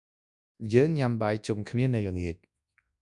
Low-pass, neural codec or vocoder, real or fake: 10.8 kHz; codec, 24 kHz, 0.9 kbps, WavTokenizer, large speech release; fake